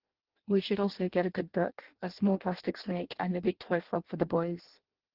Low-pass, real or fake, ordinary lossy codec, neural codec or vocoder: 5.4 kHz; fake; Opus, 16 kbps; codec, 16 kHz in and 24 kHz out, 0.6 kbps, FireRedTTS-2 codec